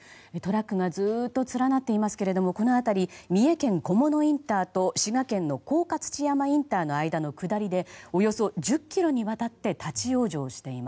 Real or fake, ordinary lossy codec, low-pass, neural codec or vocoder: real; none; none; none